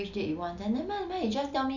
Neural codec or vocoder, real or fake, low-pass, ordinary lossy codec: none; real; 7.2 kHz; none